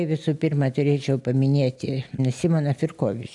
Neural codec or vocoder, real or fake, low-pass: none; real; 10.8 kHz